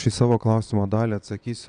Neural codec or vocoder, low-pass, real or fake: vocoder, 22.05 kHz, 80 mel bands, Vocos; 9.9 kHz; fake